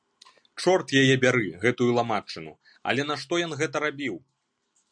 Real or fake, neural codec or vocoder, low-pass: real; none; 9.9 kHz